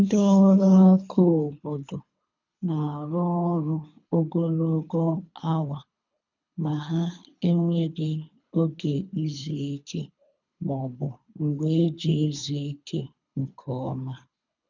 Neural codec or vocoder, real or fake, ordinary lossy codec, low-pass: codec, 24 kHz, 3 kbps, HILCodec; fake; none; 7.2 kHz